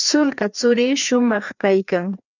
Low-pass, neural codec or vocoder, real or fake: 7.2 kHz; codec, 16 kHz, 2 kbps, FreqCodec, larger model; fake